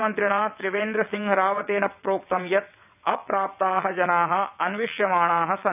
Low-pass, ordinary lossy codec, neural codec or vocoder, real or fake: 3.6 kHz; none; vocoder, 22.05 kHz, 80 mel bands, WaveNeXt; fake